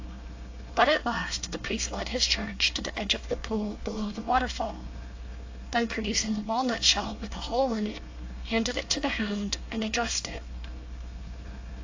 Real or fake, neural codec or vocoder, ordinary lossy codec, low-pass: fake; codec, 24 kHz, 1 kbps, SNAC; AAC, 48 kbps; 7.2 kHz